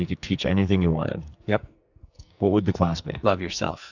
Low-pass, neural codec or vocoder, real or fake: 7.2 kHz; codec, 44.1 kHz, 2.6 kbps, SNAC; fake